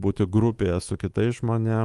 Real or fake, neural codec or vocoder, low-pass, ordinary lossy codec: fake; codec, 24 kHz, 3.1 kbps, DualCodec; 10.8 kHz; Opus, 24 kbps